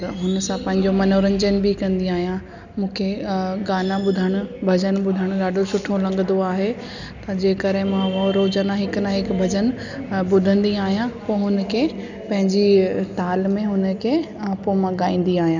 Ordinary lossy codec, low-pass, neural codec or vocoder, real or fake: none; 7.2 kHz; none; real